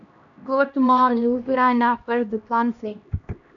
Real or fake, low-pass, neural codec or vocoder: fake; 7.2 kHz; codec, 16 kHz, 1 kbps, X-Codec, HuBERT features, trained on LibriSpeech